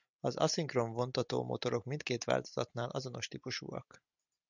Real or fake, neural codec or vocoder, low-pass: real; none; 7.2 kHz